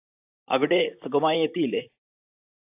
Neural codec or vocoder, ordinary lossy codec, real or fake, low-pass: vocoder, 44.1 kHz, 128 mel bands, Pupu-Vocoder; AAC, 24 kbps; fake; 3.6 kHz